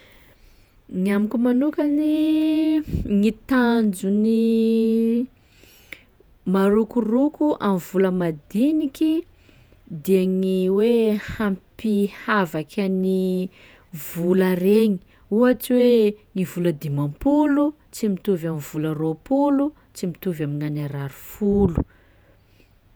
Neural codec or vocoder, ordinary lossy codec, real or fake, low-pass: vocoder, 48 kHz, 128 mel bands, Vocos; none; fake; none